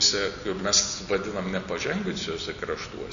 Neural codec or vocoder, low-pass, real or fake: none; 7.2 kHz; real